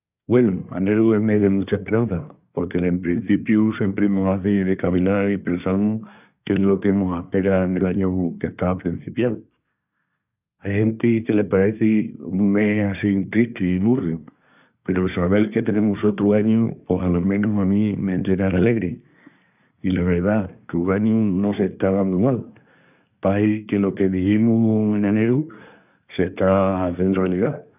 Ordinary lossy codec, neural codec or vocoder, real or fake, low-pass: none; codec, 24 kHz, 1 kbps, SNAC; fake; 3.6 kHz